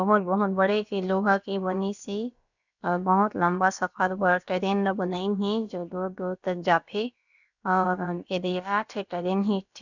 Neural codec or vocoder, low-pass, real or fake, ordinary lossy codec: codec, 16 kHz, about 1 kbps, DyCAST, with the encoder's durations; 7.2 kHz; fake; none